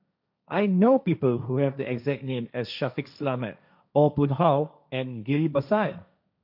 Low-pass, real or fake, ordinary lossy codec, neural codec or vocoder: 5.4 kHz; fake; none; codec, 16 kHz, 1.1 kbps, Voila-Tokenizer